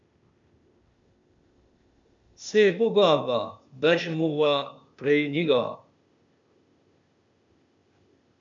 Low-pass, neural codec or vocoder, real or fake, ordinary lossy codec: 7.2 kHz; codec, 16 kHz, 0.8 kbps, ZipCodec; fake; MP3, 64 kbps